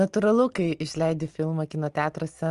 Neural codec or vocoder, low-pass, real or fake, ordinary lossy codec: none; 10.8 kHz; real; Opus, 24 kbps